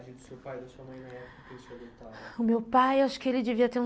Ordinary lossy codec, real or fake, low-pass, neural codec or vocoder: none; real; none; none